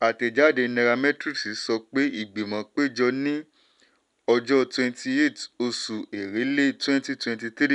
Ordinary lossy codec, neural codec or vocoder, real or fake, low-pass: none; none; real; 10.8 kHz